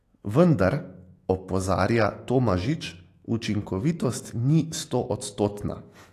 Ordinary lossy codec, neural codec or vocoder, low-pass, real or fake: AAC, 48 kbps; autoencoder, 48 kHz, 128 numbers a frame, DAC-VAE, trained on Japanese speech; 14.4 kHz; fake